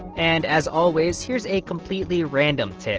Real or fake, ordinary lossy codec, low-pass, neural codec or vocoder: real; Opus, 16 kbps; 7.2 kHz; none